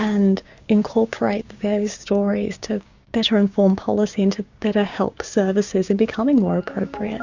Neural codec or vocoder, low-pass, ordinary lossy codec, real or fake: codec, 16 kHz, 6 kbps, DAC; 7.2 kHz; Opus, 64 kbps; fake